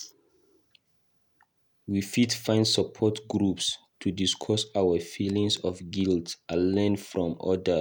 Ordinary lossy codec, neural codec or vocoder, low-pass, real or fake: none; none; 19.8 kHz; real